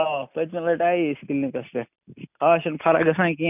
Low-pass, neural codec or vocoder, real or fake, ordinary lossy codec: 3.6 kHz; none; real; none